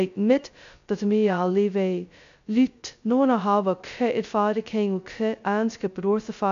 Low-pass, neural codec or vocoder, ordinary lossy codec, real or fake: 7.2 kHz; codec, 16 kHz, 0.2 kbps, FocalCodec; MP3, 64 kbps; fake